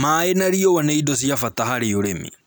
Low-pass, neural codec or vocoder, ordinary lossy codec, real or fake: none; none; none; real